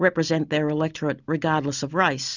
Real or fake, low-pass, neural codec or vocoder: real; 7.2 kHz; none